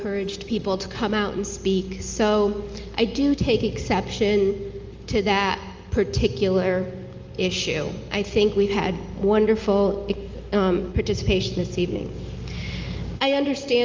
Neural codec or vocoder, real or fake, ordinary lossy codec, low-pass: none; real; Opus, 24 kbps; 7.2 kHz